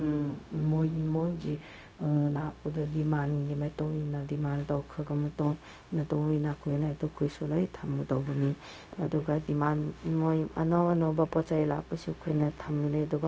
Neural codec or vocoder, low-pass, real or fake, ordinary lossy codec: codec, 16 kHz, 0.4 kbps, LongCat-Audio-Codec; none; fake; none